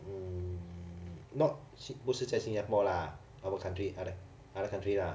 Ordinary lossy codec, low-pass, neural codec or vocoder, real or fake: none; none; none; real